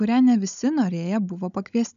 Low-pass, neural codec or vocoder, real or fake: 7.2 kHz; codec, 16 kHz, 16 kbps, FunCodec, trained on Chinese and English, 50 frames a second; fake